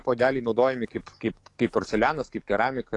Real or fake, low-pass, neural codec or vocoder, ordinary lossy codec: fake; 10.8 kHz; codec, 44.1 kHz, 7.8 kbps, DAC; AAC, 48 kbps